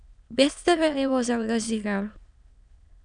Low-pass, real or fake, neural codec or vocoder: 9.9 kHz; fake; autoencoder, 22.05 kHz, a latent of 192 numbers a frame, VITS, trained on many speakers